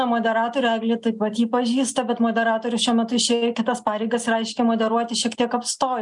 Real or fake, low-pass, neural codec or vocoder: real; 10.8 kHz; none